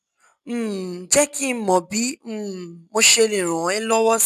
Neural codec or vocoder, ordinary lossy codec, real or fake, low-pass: none; none; real; 14.4 kHz